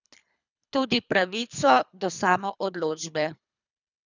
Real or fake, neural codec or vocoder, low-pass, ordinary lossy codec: fake; codec, 24 kHz, 3 kbps, HILCodec; 7.2 kHz; none